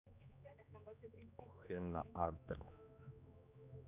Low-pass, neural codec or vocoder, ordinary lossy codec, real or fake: 3.6 kHz; codec, 16 kHz, 2 kbps, X-Codec, HuBERT features, trained on general audio; none; fake